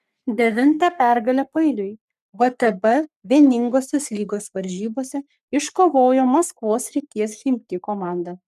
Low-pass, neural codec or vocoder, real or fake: 14.4 kHz; codec, 44.1 kHz, 3.4 kbps, Pupu-Codec; fake